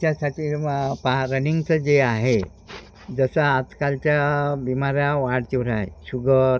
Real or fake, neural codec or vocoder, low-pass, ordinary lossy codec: real; none; none; none